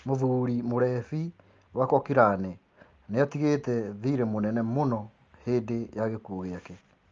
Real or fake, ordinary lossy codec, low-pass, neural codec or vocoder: real; Opus, 32 kbps; 7.2 kHz; none